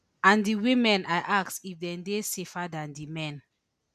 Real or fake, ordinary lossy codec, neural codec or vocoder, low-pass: real; none; none; 14.4 kHz